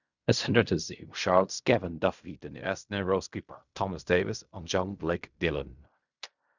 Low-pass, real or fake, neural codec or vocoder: 7.2 kHz; fake; codec, 16 kHz in and 24 kHz out, 0.4 kbps, LongCat-Audio-Codec, fine tuned four codebook decoder